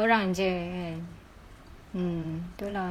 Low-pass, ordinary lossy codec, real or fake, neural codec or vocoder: 19.8 kHz; none; fake; vocoder, 44.1 kHz, 128 mel bands, Pupu-Vocoder